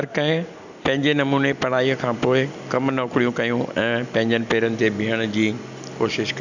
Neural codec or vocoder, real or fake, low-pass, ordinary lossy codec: none; real; 7.2 kHz; none